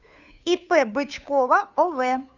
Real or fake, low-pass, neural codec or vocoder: fake; 7.2 kHz; codec, 16 kHz, 2 kbps, FunCodec, trained on Chinese and English, 25 frames a second